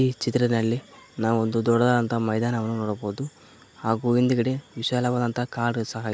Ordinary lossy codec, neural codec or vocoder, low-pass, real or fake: none; none; none; real